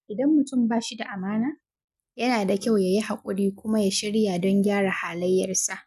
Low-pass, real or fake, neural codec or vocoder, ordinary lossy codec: 14.4 kHz; real; none; none